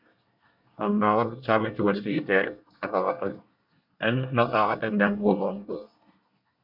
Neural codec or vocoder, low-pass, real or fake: codec, 24 kHz, 1 kbps, SNAC; 5.4 kHz; fake